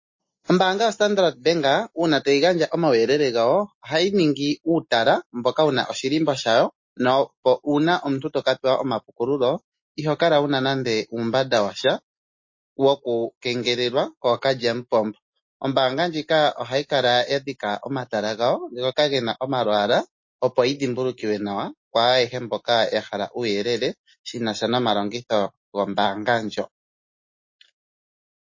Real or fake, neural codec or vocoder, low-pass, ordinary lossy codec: real; none; 7.2 kHz; MP3, 32 kbps